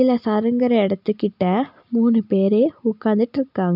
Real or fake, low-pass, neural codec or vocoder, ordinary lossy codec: real; 5.4 kHz; none; none